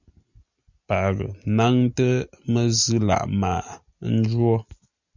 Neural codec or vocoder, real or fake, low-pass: none; real; 7.2 kHz